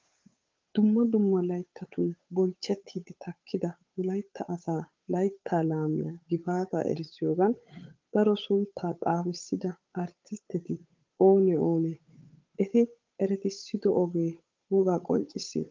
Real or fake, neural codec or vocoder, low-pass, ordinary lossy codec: fake; codec, 16 kHz, 8 kbps, FunCodec, trained on Chinese and English, 25 frames a second; 7.2 kHz; Opus, 24 kbps